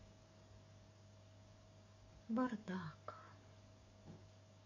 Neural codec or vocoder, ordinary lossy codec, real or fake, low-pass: codec, 44.1 kHz, 7.8 kbps, DAC; none; fake; 7.2 kHz